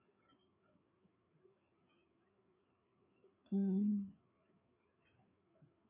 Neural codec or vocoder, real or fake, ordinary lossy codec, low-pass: codec, 16 kHz, 4 kbps, FreqCodec, larger model; fake; none; 3.6 kHz